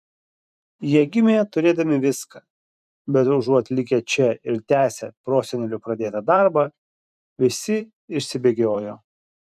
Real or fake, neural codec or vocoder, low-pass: fake; vocoder, 44.1 kHz, 128 mel bands every 512 samples, BigVGAN v2; 14.4 kHz